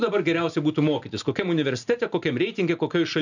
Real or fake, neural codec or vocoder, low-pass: real; none; 7.2 kHz